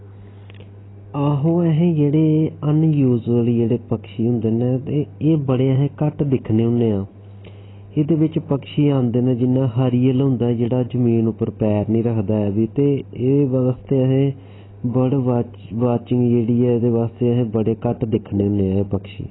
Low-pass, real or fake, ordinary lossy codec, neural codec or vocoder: 7.2 kHz; real; AAC, 16 kbps; none